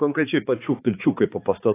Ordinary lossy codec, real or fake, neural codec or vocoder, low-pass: AAC, 16 kbps; fake; codec, 16 kHz, 4 kbps, X-Codec, HuBERT features, trained on balanced general audio; 3.6 kHz